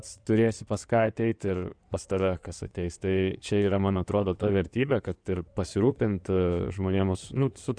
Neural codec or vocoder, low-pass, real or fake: codec, 16 kHz in and 24 kHz out, 2.2 kbps, FireRedTTS-2 codec; 9.9 kHz; fake